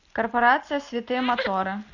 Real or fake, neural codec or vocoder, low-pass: real; none; 7.2 kHz